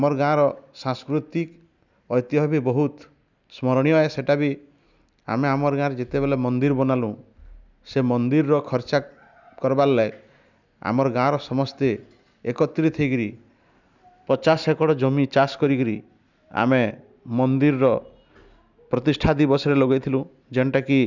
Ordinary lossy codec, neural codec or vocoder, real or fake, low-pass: none; none; real; 7.2 kHz